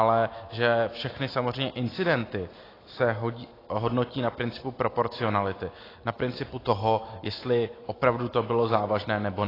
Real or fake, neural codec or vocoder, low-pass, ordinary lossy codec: real; none; 5.4 kHz; AAC, 24 kbps